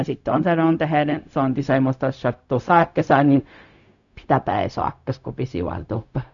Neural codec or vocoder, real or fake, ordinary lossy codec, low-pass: codec, 16 kHz, 0.4 kbps, LongCat-Audio-Codec; fake; none; 7.2 kHz